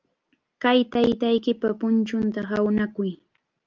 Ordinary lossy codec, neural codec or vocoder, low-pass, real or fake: Opus, 32 kbps; none; 7.2 kHz; real